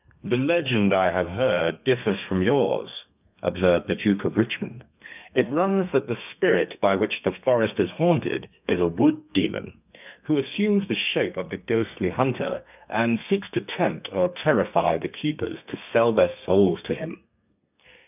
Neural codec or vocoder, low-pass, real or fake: codec, 32 kHz, 1.9 kbps, SNAC; 3.6 kHz; fake